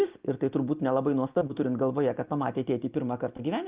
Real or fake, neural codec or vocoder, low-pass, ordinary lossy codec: real; none; 3.6 kHz; Opus, 32 kbps